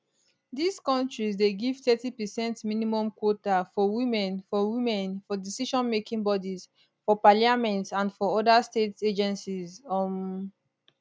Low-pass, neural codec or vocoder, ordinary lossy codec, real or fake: none; none; none; real